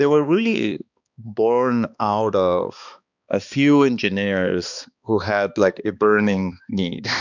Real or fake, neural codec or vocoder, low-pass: fake; codec, 16 kHz, 2 kbps, X-Codec, HuBERT features, trained on balanced general audio; 7.2 kHz